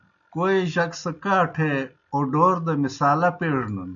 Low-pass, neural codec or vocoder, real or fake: 7.2 kHz; none; real